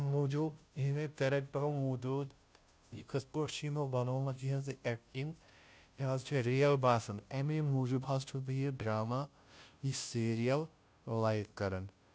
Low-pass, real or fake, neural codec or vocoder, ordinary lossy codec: none; fake; codec, 16 kHz, 0.5 kbps, FunCodec, trained on Chinese and English, 25 frames a second; none